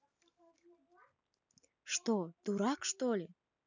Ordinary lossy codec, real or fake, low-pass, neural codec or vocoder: none; real; 7.2 kHz; none